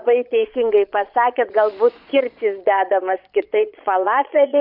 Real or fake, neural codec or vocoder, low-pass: fake; vocoder, 22.05 kHz, 80 mel bands, Vocos; 5.4 kHz